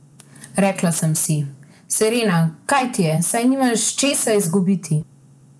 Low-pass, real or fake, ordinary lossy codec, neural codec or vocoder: none; fake; none; vocoder, 24 kHz, 100 mel bands, Vocos